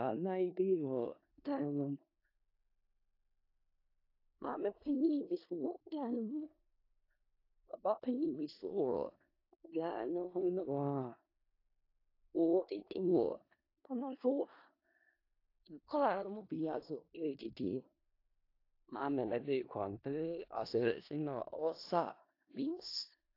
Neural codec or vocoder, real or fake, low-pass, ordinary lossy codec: codec, 16 kHz in and 24 kHz out, 0.4 kbps, LongCat-Audio-Codec, four codebook decoder; fake; 5.4 kHz; AAC, 32 kbps